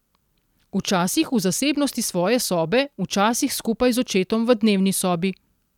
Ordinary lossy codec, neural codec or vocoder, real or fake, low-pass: none; none; real; 19.8 kHz